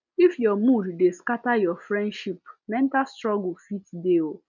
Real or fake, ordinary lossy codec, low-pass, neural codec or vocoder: real; none; 7.2 kHz; none